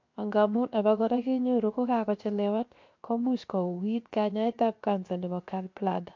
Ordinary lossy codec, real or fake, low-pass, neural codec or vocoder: MP3, 48 kbps; fake; 7.2 kHz; codec, 16 kHz, 0.7 kbps, FocalCodec